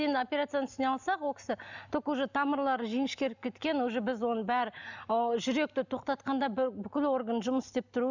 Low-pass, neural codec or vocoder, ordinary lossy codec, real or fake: 7.2 kHz; none; none; real